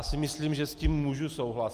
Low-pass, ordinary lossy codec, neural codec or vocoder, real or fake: 14.4 kHz; Opus, 32 kbps; none; real